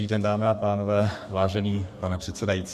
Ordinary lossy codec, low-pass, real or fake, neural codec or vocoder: MP3, 96 kbps; 14.4 kHz; fake; codec, 32 kHz, 1.9 kbps, SNAC